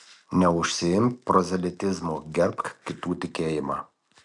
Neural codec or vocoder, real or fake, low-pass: none; real; 10.8 kHz